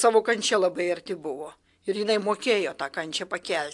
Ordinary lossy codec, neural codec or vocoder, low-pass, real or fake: AAC, 64 kbps; none; 10.8 kHz; real